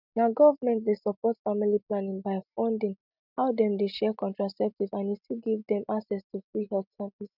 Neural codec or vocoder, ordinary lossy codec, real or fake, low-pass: none; none; real; 5.4 kHz